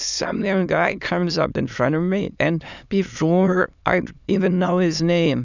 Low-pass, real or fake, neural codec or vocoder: 7.2 kHz; fake; autoencoder, 22.05 kHz, a latent of 192 numbers a frame, VITS, trained on many speakers